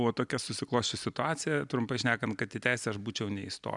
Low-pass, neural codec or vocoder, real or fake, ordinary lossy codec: 10.8 kHz; none; real; MP3, 96 kbps